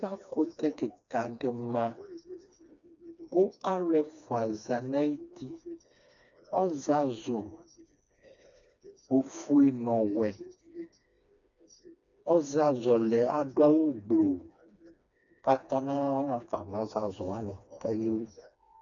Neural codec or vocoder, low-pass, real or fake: codec, 16 kHz, 2 kbps, FreqCodec, smaller model; 7.2 kHz; fake